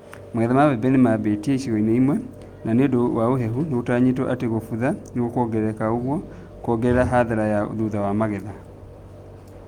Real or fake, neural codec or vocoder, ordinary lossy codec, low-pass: real; none; Opus, 32 kbps; 19.8 kHz